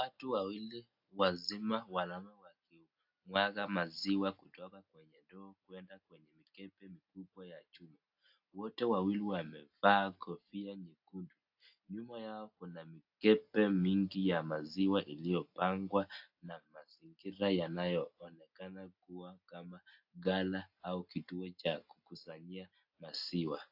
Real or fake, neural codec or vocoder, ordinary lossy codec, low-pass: real; none; AAC, 48 kbps; 5.4 kHz